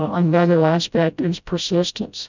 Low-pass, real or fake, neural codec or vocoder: 7.2 kHz; fake; codec, 16 kHz, 0.5 kbps, FreqCodec, smaller model